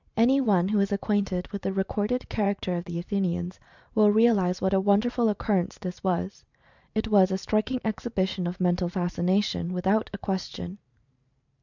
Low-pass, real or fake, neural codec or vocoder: 7.2 kHz; fake; vocoder, 44.1 kHz, 128 mel bands every 512 samples, BigVGAN v2